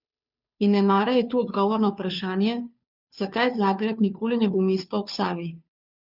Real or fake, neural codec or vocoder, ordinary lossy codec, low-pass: fake; codec, 16 kHz, 2 kbps, FunCodec, trained on Chinese and English, 25 frames a second; none; 5.4 kHz